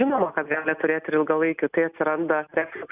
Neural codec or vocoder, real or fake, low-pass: none; real; 3.6 kHz